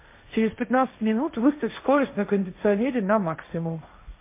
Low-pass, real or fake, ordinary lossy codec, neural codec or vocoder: 3.6 kHz; fake; MP3, 24 kbps; codec, 16 kHz, 1.1 kbps, Voila-Tokenizer